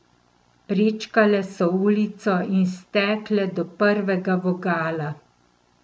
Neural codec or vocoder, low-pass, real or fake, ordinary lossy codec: none; none; real; none